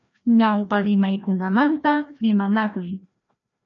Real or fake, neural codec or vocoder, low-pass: fake; codec, 16 kHz, 1 kbps, FreqCodec, larger model; 7.2 kHz